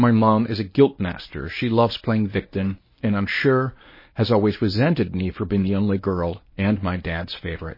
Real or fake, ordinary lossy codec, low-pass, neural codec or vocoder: fake; MP3, 24 kbps; 5.4 kHz; codec, 24 kHz, 0.9 kbps, WavTokenizer, medium speech release version 1